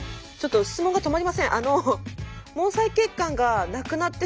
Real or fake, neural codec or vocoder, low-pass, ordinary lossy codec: real; none; none; none